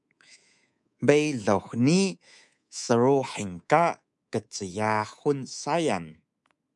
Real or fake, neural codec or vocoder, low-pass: fake; codec, 24 kHz, 3.1 kbps, DualCodec; 10.8 kHz